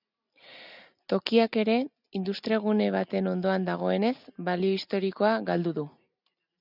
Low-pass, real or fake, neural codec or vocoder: 5.4 kHz; real; none